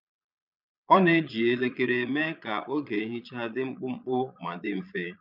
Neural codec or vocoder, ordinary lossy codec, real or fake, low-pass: vocoder, 22.05 kHz, 80 mel bands, Vocos; none; fake; 5.4 kHz